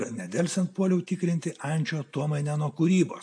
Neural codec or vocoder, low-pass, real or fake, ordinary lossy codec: none; 9.9 kHz; real; AAC, 64 kbps